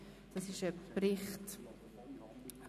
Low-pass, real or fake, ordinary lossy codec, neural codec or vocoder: 14.4 kHz; real; none; none